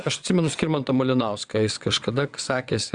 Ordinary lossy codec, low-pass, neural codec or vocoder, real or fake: Opus, 64 kbps; 9.9 kHz; vocoder, 22.05 kHz, 80 mel bands, WaveNeXt; fake